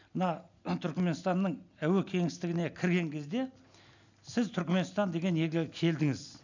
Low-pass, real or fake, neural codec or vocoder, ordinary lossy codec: 7.2 kHz; real; none; none